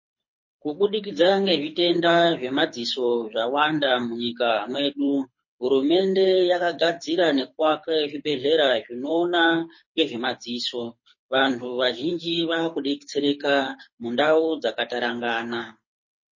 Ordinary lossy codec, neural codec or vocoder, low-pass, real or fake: MP3, 32 kbps; codec, 24 kHz, 6 kbps, HILCodec; 7.2 kHz; fake